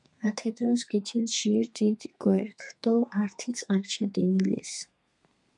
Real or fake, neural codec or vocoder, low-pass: fake; codec, 32 kHz, 1.9 kbps, SNAC; 10.8 kHz